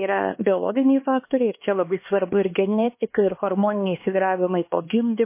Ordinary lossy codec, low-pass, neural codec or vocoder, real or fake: MP3, 24 kbps; 3.6 kHz; codec, 16 kHz, 2 kbps, X-Codec, HuBERT features, trained on LibriSpeech; fake